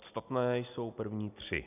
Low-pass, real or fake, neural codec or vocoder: 3.6 kHz; real; none